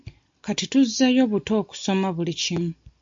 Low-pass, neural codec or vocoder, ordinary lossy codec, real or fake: 7.2 kHz; none; MP3, 48 kbps; real